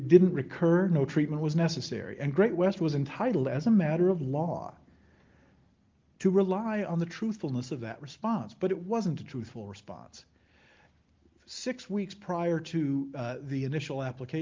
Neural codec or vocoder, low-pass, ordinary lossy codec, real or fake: none; 7.2 kHz; Opus, 32 kbps; real